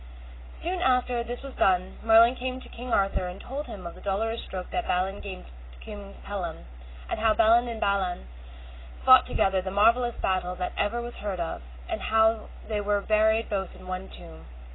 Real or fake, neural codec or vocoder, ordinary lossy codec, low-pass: real; none; AAC, 16 kbps; 7.2 kHz